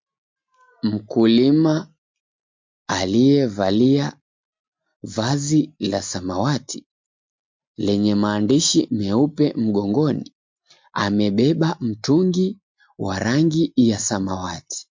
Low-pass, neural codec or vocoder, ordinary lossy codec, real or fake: 7.2 kHz; none; MP3, 48 kbps; real